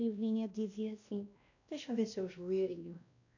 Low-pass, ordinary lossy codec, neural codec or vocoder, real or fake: 7.2 kHz; none; codec, 16 kHz, 1 kbps, X-Codec, WavLM features, trained on Multilingual LibriSpeech; fake